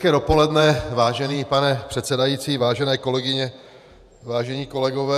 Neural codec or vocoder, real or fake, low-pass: vocoder, 48 kHz, 128 mel bands, Vocos; fake; 14.4 kHz